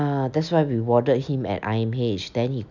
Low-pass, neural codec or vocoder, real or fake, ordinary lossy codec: 7.2 kHz; none; real; none